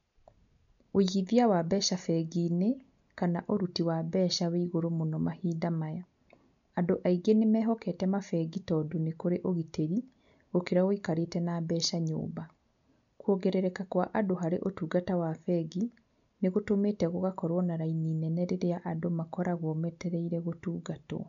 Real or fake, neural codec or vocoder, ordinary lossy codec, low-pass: real; none; none; 7.2 kHz